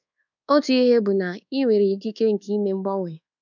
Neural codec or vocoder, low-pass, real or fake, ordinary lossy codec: codec, 24 kHz, 1.2 kbps, DualCodec; 7.2 kHz; fake; none